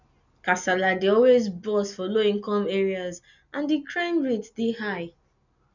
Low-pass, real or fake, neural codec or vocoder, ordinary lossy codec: 7.2 kHz; real; none; none